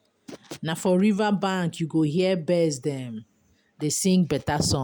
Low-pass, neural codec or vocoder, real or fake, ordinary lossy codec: none; none; real; none